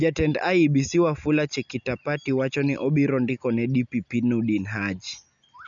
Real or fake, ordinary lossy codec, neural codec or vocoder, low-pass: real; none; none; 7.2 kHz